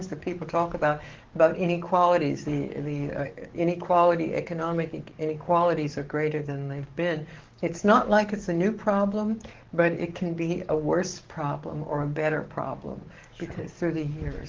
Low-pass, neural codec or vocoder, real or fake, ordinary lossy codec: 7.2 kHz; codec, 44.1 kHz, 7.8 kbps, DAC; fake; Opus, 16 kbps